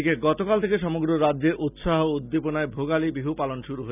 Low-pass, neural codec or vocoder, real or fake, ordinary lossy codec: 3.6 kHz; none; real; none